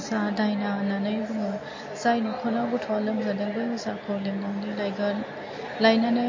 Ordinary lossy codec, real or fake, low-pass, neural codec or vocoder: MP3, 32 kbps; real; 7.2 kHz; none